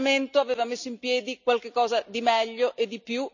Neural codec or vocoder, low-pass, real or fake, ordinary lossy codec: none; 7.2 kHz; real; none